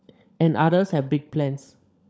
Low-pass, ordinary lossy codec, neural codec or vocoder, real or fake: none; none; codec, 16 kHz, 16 kbps, FunCodec, trained on LibriTTS, 50 frames a second; fake